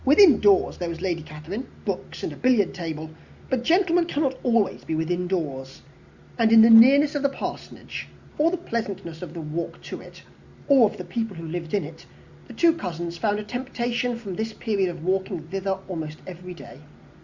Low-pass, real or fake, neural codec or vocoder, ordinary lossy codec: 7.2 kHz; real; none; Opus, 64 kbps